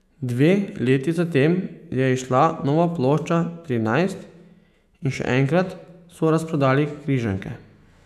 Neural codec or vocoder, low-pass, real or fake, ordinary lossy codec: autoencoder, 48 kHz, 128 numbers a frame, DAC-VAE, trained on Japanese speech; 14.4 kHz; fake; none